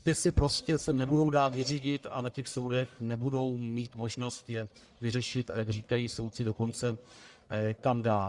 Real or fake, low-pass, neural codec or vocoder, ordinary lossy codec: fake; 10.8 kHz; codec, 44.1 kHz, 1.7 kbps, Pupu-Codec; Opus, 64 kbps